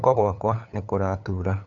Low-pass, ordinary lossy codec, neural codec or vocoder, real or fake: 7.2 kHz; none; codec, 16 kHz, 8 kbps, FunCodec, trained on LibriTTS, 25 frames a second; fake